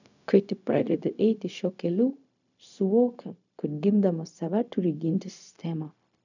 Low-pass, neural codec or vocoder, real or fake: 7.2 kHz; codec, 16 kHz, 0.4 kbps, LongCat-Audio-Codec; fake